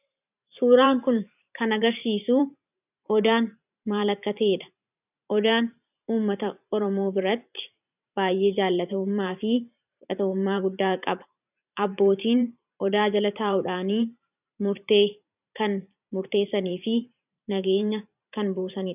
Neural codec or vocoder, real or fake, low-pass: vocoder, 44.1 kHz, 128 mel bands every 512 samples, BigVGAN v2; fake; 3.6 kHz